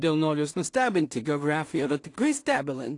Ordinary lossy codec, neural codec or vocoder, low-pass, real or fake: AAC, 48 kbps; codec, 16 kHz in and 24 kHz out, 0.4 kbps, LongCat-Audio-Codec, two codebook decoder; 10.8 kHz; fake